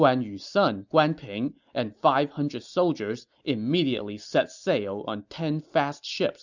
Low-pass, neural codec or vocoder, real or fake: 7.2 kHz; none; real